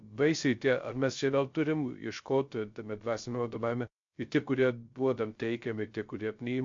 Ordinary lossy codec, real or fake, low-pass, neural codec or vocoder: MP3, 48 kbps; fake; 7.2 kHz; codec, 16 kHz, 0.3 kbps, FocalCodec